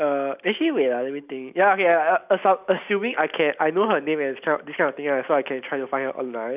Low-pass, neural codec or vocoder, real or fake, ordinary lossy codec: 3.6 kHz; none; real; none